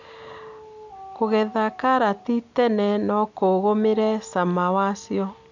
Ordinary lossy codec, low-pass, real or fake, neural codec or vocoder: none; 7.2 kHz; real; none